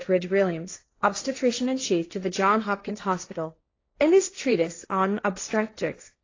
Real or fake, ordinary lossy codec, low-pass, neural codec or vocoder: fake; AAC, 32 kbps; 7.2 kHz; codec, 16 kHz, 1.1 kbps, Voila-Tokenizer